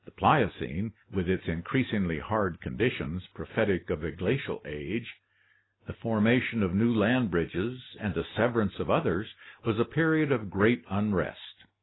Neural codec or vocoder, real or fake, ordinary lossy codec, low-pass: none; real; AAC, 16 kbps; 7.2 kHz